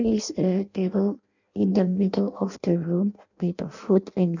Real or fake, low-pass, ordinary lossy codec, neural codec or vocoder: fake; 7.2 kHz; none; codec, 16 kHz in and 24 kHz out, 0.6 kbps, FireRedTTS-2 codec